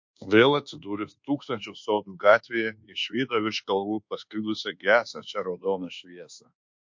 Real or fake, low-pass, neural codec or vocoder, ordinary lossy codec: fake; 7.2 kHz; codec, 24 kHz, 1.2 kbps, DualCodec; MP3, 48 kbps